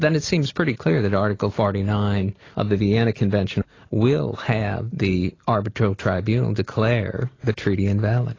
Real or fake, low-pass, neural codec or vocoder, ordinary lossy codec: real; 7.2 kHz; none; AAC, 32 kbps